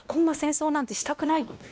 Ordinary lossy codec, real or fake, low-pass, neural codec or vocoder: none; fake; none; codec, 16 kHz, 1 kbps, X-Codec, WavLM features, trained on Multilingual LibriSpeech